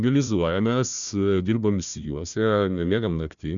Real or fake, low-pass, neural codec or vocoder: fake; 7.2 kHz; codec, 16 kHz, 1 kbps, FunCodec, trained on Chinese and English, 50 frames a second